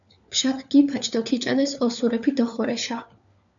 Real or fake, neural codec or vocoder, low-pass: fake; codec, 16 kHz, 4 kbps, FunCodec, trained on LibriTTS, 50 frames a second; 7.2 kHz